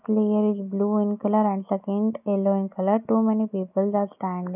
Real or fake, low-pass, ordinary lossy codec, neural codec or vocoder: real; 3.6 kHz; none; none